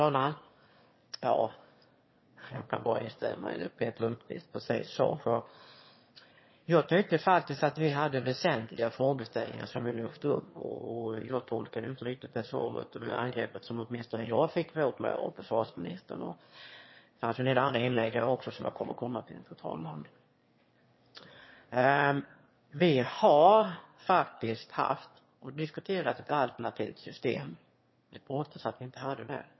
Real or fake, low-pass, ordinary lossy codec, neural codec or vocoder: fake; 7.2 kHz; MP3, 24 kbps; autoencoder, 22.05 kHz, a latent of 192 numbers a frame, VITS, trained on one speaker